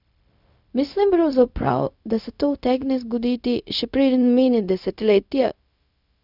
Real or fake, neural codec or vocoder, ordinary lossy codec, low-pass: fake; codec, 16 kHz, 0.4 kbps, LongCat-Audio-Codec; none; 5.4 kHz